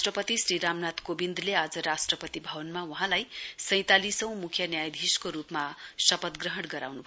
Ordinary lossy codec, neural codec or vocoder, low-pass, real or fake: none; none; none; real